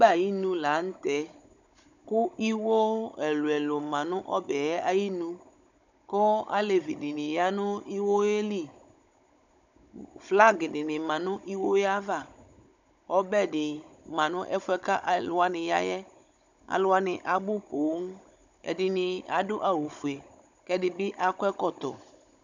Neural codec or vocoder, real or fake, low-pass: codec, 16 kHz, 16 kbps, FunCodec, trained on Chinese and English, 50 frames a second; fake; 7.2 kHz